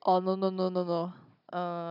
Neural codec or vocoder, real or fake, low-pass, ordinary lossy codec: codec, 16 kHz, 16 kbps, FunCodec, trained on Chinese and English, 50 frames a second; fake; 5.4 kHz; none